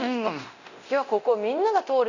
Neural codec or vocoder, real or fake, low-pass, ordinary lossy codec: codec, 24 kHz, 0.9 kbps, DualCodec; fake; 7.2 kHz; none